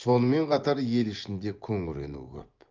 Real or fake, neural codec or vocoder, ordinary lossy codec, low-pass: fake; vocoder, 24 kHz, 100 mel bands, Vocos; Opus, 32 kbps; 7.2 kHz